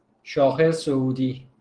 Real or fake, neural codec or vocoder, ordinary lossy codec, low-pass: real; none; Opus, 16 kbps; 9.9 kHz